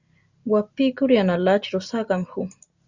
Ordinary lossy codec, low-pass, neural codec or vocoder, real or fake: Opus, 64 kbps; 7.2 kHz; none; real